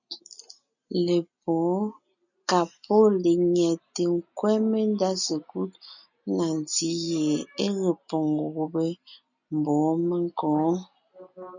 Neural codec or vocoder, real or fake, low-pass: none; real; 7.2 kHz